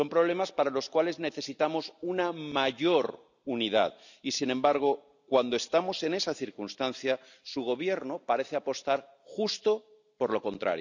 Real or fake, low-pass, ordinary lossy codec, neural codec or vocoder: real; 7.2 kHz; none; none